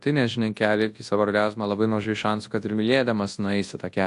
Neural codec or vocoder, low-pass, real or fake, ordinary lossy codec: codec, 24 kHz, 0.9 kbps, WavTokenizer, large speech release; 10.8 kHz; fake; AAC, 64 kbps